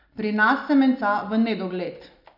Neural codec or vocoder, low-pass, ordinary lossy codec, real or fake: none; 5.4 kHz; none; real